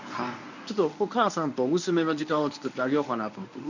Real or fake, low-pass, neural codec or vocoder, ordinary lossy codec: fake; 7.2 kHz; codec, 24 kHz, 0.9 kbps, WavTokenizer, medium speech release version 1; none